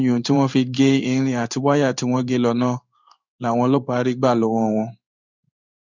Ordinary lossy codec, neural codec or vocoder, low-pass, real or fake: none; codec, 16 kHz in and 24 kHz out, 1 kbps, XY-Tokenizer; 7.2 kHz; fake